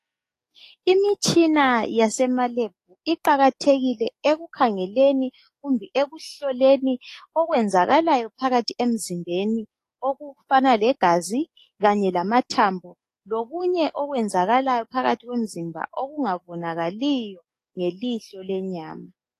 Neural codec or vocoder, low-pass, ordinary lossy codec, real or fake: codec, 44.1 kHz, 7.8 kbps, DAC; 14.4 kHz; AAC, 48 kbps; fake